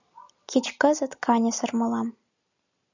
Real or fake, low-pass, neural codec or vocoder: real; 7.2 kHz; none